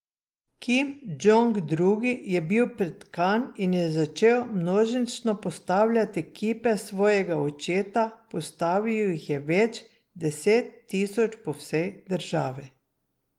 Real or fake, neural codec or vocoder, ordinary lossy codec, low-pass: real; none; Opus, 24 kbps; 19.8 kHz